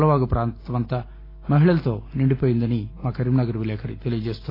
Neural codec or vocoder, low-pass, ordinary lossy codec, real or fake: none; 5.4 kHz; AAC, 24 kbps; real